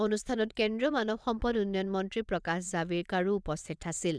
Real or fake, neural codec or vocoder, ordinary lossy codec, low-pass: fake; vocoder, 22.05 kHz, 80 mel bands, WaveNeXt; none; none